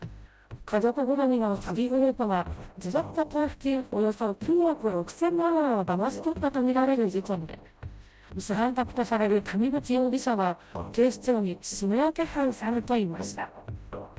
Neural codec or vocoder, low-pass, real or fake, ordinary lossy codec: codec, 16 kHz, 0.5 kbps, FreqCodec, smaller model; none; fake; none